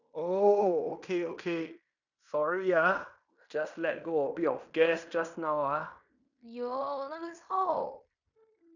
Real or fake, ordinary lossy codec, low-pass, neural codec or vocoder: fake; none; 7.2 kHz; codec, 16 kHz in and 24 kHz out, 0.9 kbps, LongCat-Audio-Codec, fine tuned four codebook decoder